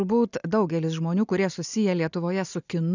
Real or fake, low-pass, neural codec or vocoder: real; 7.2 kHz; none